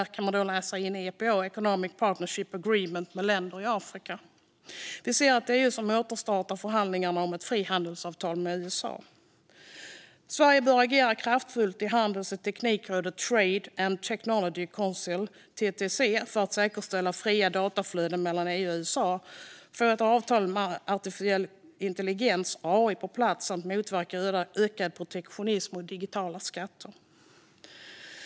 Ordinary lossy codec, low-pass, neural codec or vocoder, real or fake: none; none; none; real